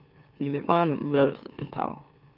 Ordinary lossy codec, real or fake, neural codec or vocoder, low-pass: Opus, 24 kbps; fake; autoencoder, 44.1 kHz, a latent of 192 numbers a frame, MeloTTS; 5.4 kHz